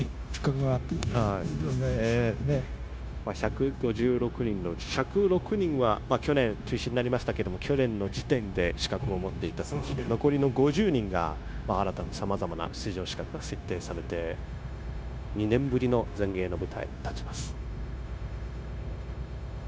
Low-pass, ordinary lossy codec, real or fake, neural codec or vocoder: none; none; fake; codec, 16 kHz, 0.9 kbps, LongCat-Audio-Codec